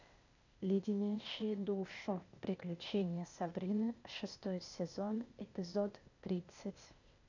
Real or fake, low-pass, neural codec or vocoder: fake; 7.2 kHz; codec, 16 kHz, 0.8 kbps, ZipCodec